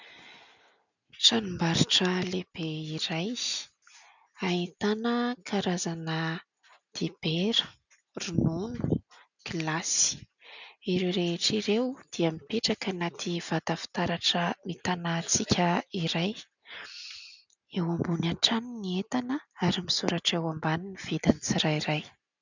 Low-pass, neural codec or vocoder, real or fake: 7.2 kHz; none; real